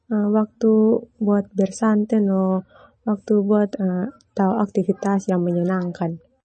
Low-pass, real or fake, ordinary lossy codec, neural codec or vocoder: 10.8 kHz; real; MP3, 32 kbps; none